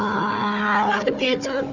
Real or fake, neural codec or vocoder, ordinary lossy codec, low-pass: fake; codec, 16 kHz, 4 kbps, FunCodec, trained on Chinese and English, 50 frames a second; none; 7.2 kHz